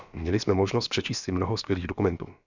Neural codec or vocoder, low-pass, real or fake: codec, 16 kHz, about 1 kbps, DyCAST, with the encoder's durations; 7.2 kHz; fake